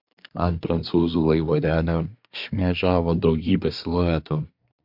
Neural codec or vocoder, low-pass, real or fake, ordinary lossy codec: codec, 24 kHz, 1 kbps, SNAC; 5.4 kHz; fake; MP3, 48 kbps